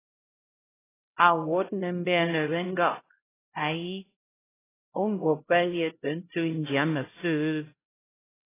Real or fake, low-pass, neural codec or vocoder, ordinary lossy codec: fake; 3.6 kHz; codec, 16 kHz, 0.5 kbps, X-Codec, HuBERT features, trained on LibriSpeech; AAC, 16 kbps